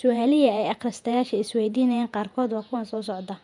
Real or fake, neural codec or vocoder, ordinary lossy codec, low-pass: fake; vocoder, 48 kHz, 128 mel bands, Vocos; none; 10.8 kHz